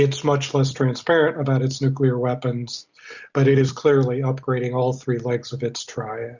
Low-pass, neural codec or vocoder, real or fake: 7.2 kHz; none; real